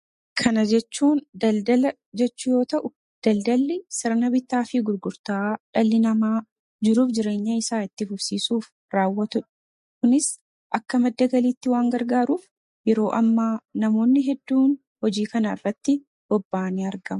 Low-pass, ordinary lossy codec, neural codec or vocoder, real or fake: 14.4 kHz; MP3, 48 kbps; none; real